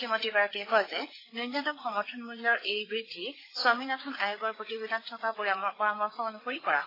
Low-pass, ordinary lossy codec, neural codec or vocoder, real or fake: 5.4 kHz; AAC, 24 kbps; codec, 16 kHz, 4 kbps, FreqCodec, larger model; fake